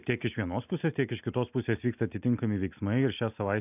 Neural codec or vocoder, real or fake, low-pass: none; real; 3.6 kHz